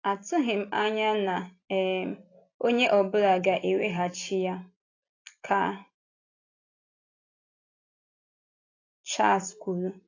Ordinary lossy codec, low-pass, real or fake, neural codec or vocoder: AAC, 32 kbps; 7.2 kHz; real; none